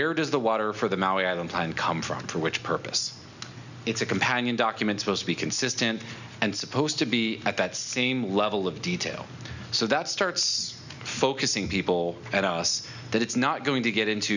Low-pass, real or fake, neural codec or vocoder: 7.2 kHz; real; none